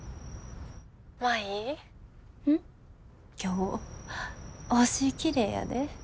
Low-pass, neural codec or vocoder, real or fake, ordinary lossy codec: none; none; real; none